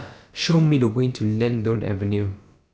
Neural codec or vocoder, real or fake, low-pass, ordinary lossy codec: codec, 16 kHz, about 1 kbps, DyCAST, with the encoder's durations; fake; none; none